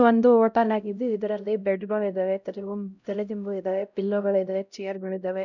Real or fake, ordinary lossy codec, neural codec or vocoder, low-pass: fake; none; codec, 16 kHz, 0.5 kbps, X-Codec, HuBERT features, trained on LibriSpeech; 7.2 kHz